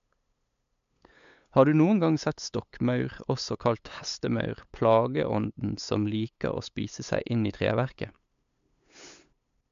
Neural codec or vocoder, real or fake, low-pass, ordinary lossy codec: codec, 16 kHz, 8 kbps, FunCodec, trained on LibriTTS, 25 frames a second; fake; 7.2 kHz; MP3, 64 kbps